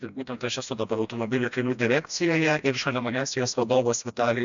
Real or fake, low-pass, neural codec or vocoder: fake; 7.2 kHz; codec, 16 kHz, 1 kbps, FreqCodec, smaller model